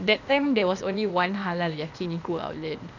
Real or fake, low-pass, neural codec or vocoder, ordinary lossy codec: fake; 7.2 kHz; codec, 16 kHz, 0.8 kbps, ZipCodec; none